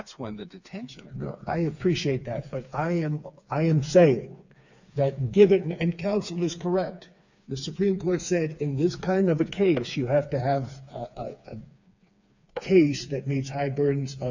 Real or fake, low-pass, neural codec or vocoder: fake; 7.2 kHz; codec, 16 kHz, 4 kbps, FreqCodec, smaller model